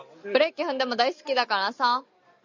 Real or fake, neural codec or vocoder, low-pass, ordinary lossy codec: real; none; 7.2 kHz; MP3, 64 kbps